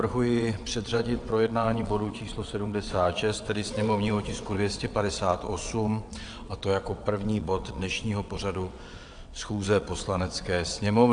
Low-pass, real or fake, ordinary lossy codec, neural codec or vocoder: 9.9 kHz; fake; AAC, 64 kbps; vocoder, 22.05 kHz, 80 mel bands, WaveNeXt